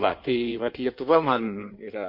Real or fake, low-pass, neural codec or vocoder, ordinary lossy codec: fake; 5.4 kHz; codec, 16 kHz in and 24 kHz out, 1.1 kbps, FireRedTTS-2 codec; MP3, 32 kbps